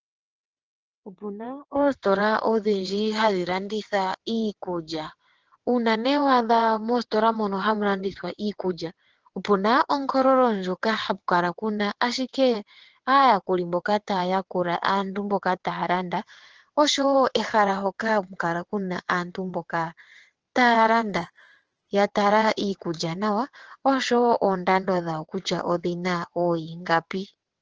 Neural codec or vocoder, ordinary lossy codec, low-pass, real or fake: vocoder, 22.05 kHz, 80 mel bands, WaveNeXt; Opus, 16 kbps; 7.2 kHz; fake